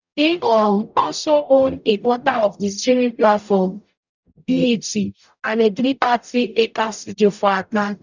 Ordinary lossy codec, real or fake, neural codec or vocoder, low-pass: none; fake; codec, 44.1 kHz, 0.9 kbps, DAC; 7.2 kHz